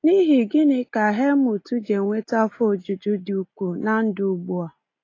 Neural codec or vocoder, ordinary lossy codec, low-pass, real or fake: none; AAC, 32 kbps; 7.2 kHz; real